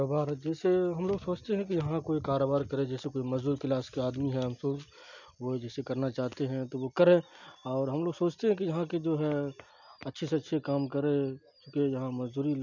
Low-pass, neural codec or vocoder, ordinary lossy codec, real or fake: 7.2 kHz; none; none; real